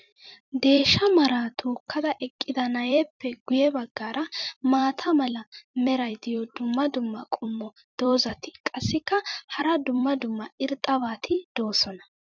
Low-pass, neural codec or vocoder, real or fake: 7.2 kHz; vocoder, 44.1 kHz, 128 mel bands every 256 samples, BigVGAN v2; fake